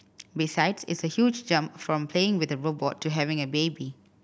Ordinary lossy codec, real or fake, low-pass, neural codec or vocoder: none; real; none; none